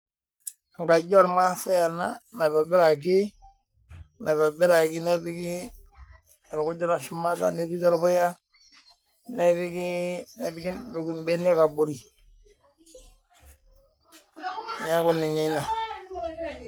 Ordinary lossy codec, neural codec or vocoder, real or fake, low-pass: none; codec, 44.1 kHz, 3.4 kbps, Pupu-Codec; fake; none